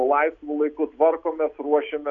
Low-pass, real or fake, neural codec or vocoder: 7.2 kHz; real; none